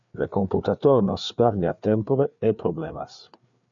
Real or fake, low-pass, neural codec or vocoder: fake; 7.2 kHz; codec, 16 kHz, 4 kbps, FreqCodec, larger model